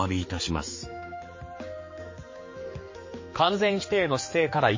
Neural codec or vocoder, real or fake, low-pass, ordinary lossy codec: codec, 16 kHz, 4 kbps, X-Codec, HuBERT features, trained on general audio; fake; 7.2 kHz; MP3, 32 kbps